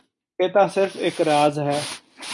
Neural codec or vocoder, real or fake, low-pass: none; real; 10.8 kHz